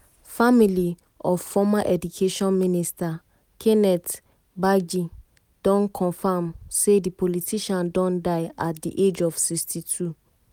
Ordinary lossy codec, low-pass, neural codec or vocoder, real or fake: none; none; none; real